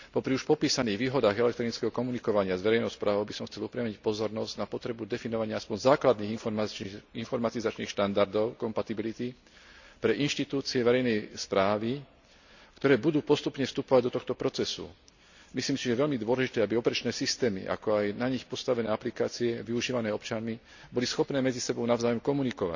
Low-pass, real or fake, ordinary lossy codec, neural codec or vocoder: 7.2 kHz; real; none; none